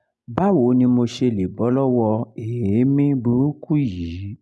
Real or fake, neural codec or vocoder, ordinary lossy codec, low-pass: real; none; none; none